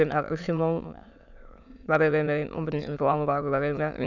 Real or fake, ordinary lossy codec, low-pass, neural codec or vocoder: fake; none; 7.2 kHz; autoencoder, 22.05 kHz, a latent of 192 numbers a frame, VITS, trained on many speakers